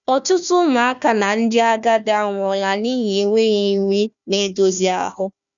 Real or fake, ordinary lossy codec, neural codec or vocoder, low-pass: fake; none; codec, 16 kHz, 1 kbps, FunCodec, trained on Chinese and English, 50 frames a second; 7.2 kHz